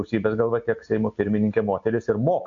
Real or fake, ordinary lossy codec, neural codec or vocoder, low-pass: real; AAC, 48 kbps; none; 7.2 kHz